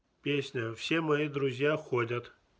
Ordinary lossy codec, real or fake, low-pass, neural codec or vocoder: none; real; none; none